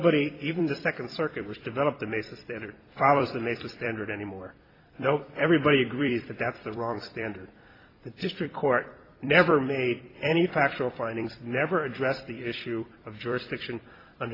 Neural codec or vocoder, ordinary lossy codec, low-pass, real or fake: vocoder, 44.1 kHz, 128 mel bands every 256 samples, BigVGAN v2; AAC, 24 kbps; 5.4 kHz; fake